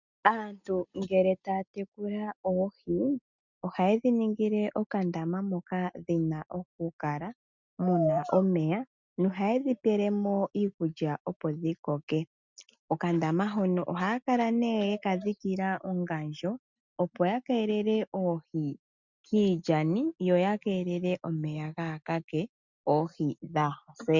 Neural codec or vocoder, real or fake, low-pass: none; real; 7.2 kHz